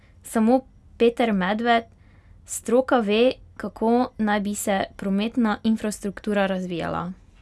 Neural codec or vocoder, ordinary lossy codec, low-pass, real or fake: none; none; none; real